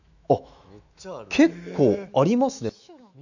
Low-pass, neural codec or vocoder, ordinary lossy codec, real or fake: 7.2 kHz; autoencoder, 48 kHz, 128 numbers a frame, DAC-VAE, trained on Japanese speech; none; fake